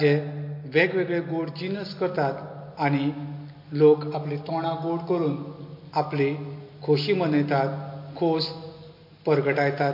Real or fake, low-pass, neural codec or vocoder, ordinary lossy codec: real; 5.4 kHz; none; MP3, 32 kbps